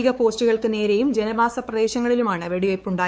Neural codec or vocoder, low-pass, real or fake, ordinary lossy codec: codec, 16 kHz, 4 kbps, X-Codec, WavLM features, trained on Multilingual LibriSpeech; none; fake; none